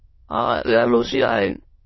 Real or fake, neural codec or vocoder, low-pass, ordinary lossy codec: fake; autoencoder, 22.05 kHz, a latent of 192 numbers a frame, VITS, trained on many speakers; 7.2 kHz; MP3, 24 kbps